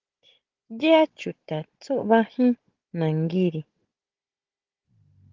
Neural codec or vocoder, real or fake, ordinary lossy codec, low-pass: codec, 16 kHz, 16 kbps, FunCodec, trained on Chinese and English, 50 frames a second; fake; Opus, 16 kbps; 7.2 kHz